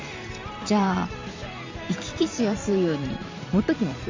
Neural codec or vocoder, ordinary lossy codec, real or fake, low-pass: vocoder, 44.1 kHz, 128 mel bands every 512 samples, BigVGAN v2; MP3, 64 kbps; fake; 7.2 kHz